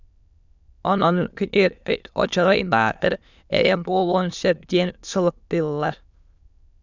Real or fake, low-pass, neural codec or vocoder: fake; 7.2 kHz; autoencoder, 22.05 kHz, a latent of 192 numbers a frame, VITS, trained on many speakers